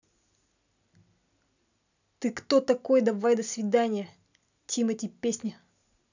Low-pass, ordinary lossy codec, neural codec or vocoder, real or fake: 7.2 kHz; none; none; real